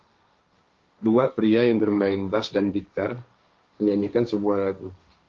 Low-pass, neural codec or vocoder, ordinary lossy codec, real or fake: 7.2 kHz; codec, 16 kHz, 1.1 kbps, Voila-Tokenizer; Opus, 32 kbps; fake